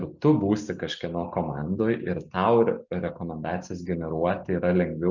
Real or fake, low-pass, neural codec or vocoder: real; 7.2 kHz; none